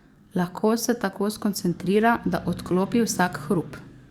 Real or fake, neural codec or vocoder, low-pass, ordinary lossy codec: fake; codec, 44.1 kHz, 7.8 kbps, DAC; 19.8 kHz; Opus, 64 kbps